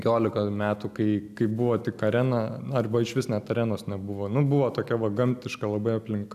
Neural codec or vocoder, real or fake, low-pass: none; real; 14.4 kHz